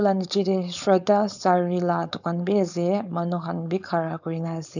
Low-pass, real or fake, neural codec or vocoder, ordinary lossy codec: 7.2 kHz; fake; codec, 16 kHz, 4.8 kbps, FACodec; none